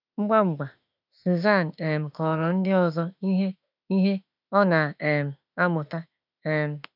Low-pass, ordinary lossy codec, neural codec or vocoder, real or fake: 5.4 kHz; none; autoencoder, 48 kHz, 32 numbers a frame, DAC-VAE, trained on Japanese speech; fake